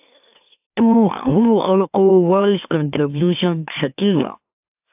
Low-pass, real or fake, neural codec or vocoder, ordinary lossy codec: 3.6 kHz; fake; autoencoder, 44.1 kHz, a latent of 192 numbers a frame, MeloTTS; AAC, 32 kbps